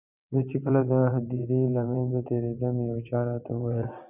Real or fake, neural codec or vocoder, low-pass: fake; vocoder, 22.05 kHz, 80 mel bands, WaveNeXt; 3.6 kHz